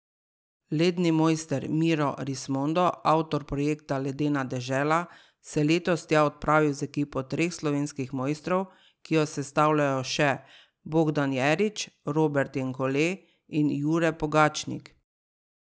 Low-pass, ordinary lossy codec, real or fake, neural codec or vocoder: none; none; real; none